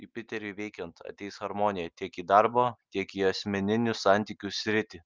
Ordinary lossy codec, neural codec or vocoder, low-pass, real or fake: Opus, 24 kbps; none; 7.2 kHz; real